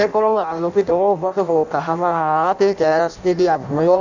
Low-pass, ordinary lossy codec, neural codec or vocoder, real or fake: 7.2 kHz; none; codec, 16 kHz in and 24 kHz out, 0.6 kbps, FireRedTTS-2 codec; fake